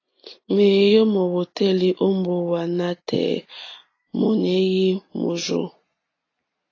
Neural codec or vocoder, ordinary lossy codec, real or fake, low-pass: none; AAC, 32 kbps; real; 7.2 kHz